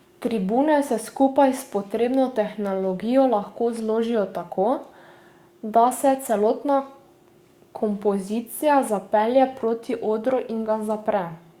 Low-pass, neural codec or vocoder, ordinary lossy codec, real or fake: 19.8 kHz; codec, 44.1 kHz, 7.8 kbps, DAC; Opus, 64 kbps; fake